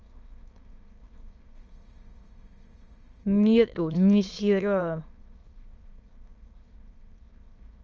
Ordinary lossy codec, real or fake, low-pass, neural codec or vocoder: Opus, 24 kbps; fake; 7.2 kHz; autoencoder, 22.05 kHz, a latent of 192 numbers a frame, VITS, trained on many speakers